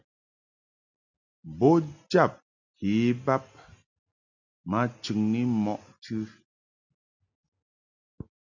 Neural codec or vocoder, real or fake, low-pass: none; real; 7.2 kHz